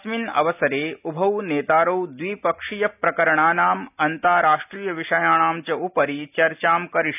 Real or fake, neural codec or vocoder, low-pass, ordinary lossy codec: real; none; 3.6 kHz; none